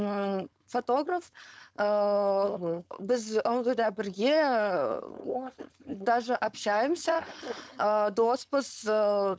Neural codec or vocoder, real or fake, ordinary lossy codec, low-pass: codec, 16 kHz, 4.8 kbps, FACodec; fake; none; none